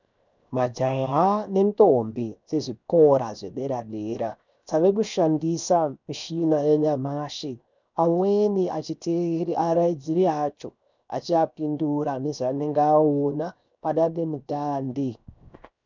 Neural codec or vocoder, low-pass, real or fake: codec, 16 kHz, 0.7 kbps, FocalCodec; 7.2 kHz; fake